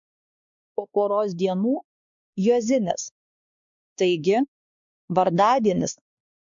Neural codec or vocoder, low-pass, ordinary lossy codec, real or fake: codec, 16 kHz, 2 kbps, X-Codec, WavLM features, trained on Multilingual LibriSpeech; 7.2 kHz; MP3, 64 kbps; fake